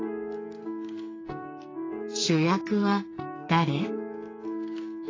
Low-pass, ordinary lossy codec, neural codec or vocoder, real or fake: 7.2 kHz; AAC, 32 kbps; codec, 44.1 kHz, 2.6 kbps, SNAC; fake